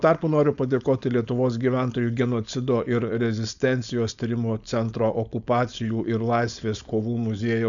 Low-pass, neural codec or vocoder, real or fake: 7.2 kHz; codec, 16 kHz, 4.8 kbps, FACodec; fake